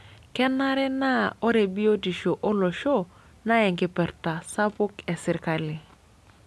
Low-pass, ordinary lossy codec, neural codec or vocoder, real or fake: none; none; none; real